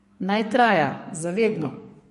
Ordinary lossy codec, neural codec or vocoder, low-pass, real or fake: MP3, 48 kbps; codec, 44.1 kHz, 2.6 kbps, SNAC; 14.4 kHz; fake